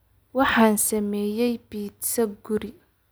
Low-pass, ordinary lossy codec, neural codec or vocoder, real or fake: none; none; none; real